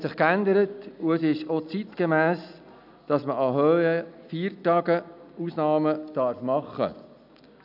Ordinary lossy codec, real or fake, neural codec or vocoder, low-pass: none; real; none; 5.4 kHz